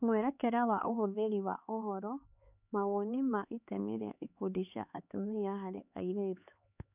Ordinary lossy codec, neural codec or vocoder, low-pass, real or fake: none; codec, 16 kHz, 4 kbps, X-Codec, HuBERT features, trained on LibriSpeech; 3.6 kHz; fake